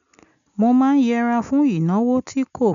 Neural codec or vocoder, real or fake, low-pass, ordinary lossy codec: none; real; 7.2 kHz; none